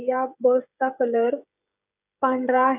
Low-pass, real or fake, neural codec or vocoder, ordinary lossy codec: 3.6 kHz; fake; codec, 16 kHz, 16 kbps, FreqCodec, smaller model; none